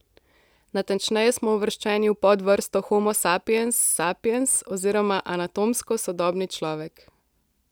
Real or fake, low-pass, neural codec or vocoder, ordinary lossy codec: real; none; none; none